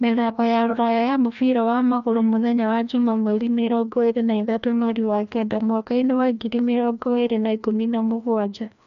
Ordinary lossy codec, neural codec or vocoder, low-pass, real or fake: none; codec, 16 kHz, 1 kbps, FreqCodec, larger model; 7.2 kHz; fake